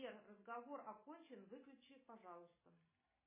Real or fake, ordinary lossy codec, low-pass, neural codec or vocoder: real; MP3, 16 kbps; 3.6 kHz; none